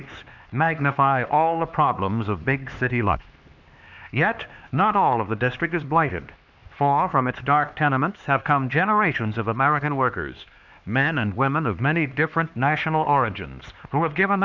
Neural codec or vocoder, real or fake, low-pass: codec, 16 kHz, 2 kbps, X-Codec, HuBERT features, trained on LibriSpeech; fake; 7.2 kHz